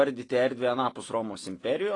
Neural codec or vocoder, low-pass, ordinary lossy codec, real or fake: none; 10.8 kHz; AAC, 32 kbps; real